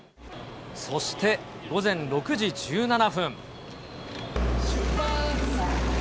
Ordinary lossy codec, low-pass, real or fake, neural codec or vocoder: none; none; real; none